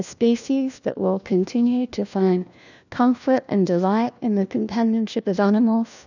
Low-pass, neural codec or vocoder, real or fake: 7.2 kHz; codec, 16 kHz, 1 kbps, FunCodec, trained on LibriTTS, 50 frames a second; fake